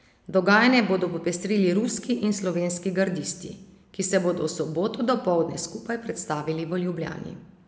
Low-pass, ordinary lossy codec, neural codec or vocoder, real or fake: none; none; none; real